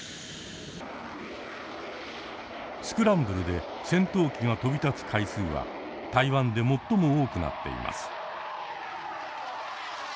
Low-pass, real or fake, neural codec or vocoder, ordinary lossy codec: none; real; none; none